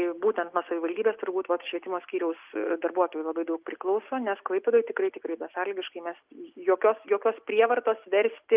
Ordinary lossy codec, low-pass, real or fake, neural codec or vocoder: Opus, 32 kbps; 3.6 kHz; real; none